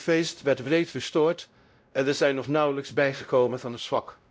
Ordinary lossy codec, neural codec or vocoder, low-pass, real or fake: none; codec, 16 kHz, 0.5 kbps, X-Codec, WavLM features, trained on Multilingual LibriSpeech; none; fake